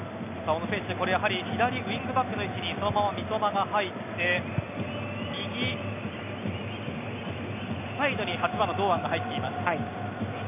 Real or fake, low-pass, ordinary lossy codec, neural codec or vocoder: real; 3.6 kHz; none; none